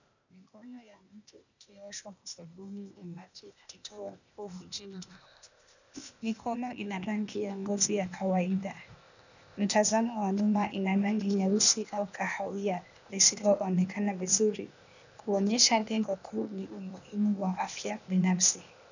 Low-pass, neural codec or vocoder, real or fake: 7.2 kHz; codec, 16 kHz, 0.8 kbps, ZipCodec; fake